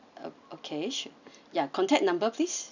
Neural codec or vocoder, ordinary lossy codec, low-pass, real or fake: none; none; 7.2 kHz; real